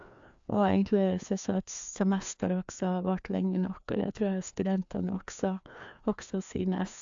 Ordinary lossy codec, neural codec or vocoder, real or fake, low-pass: AAC, 64 kbps; codec, 16 kHz, 2 kbps, FreqCodec, larger model; fake; 7.2 kHz